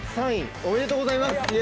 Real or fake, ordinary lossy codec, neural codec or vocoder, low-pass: real; none; none; none